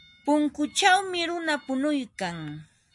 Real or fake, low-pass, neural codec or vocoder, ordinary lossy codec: real; 10.8 kHz; none; MP3, 64 kbps